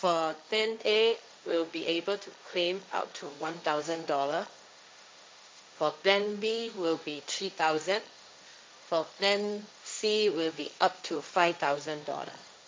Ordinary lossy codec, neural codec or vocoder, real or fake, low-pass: none; codec, 16 kHz, 1.1 kbps, Voila-Tokenizer; fake; none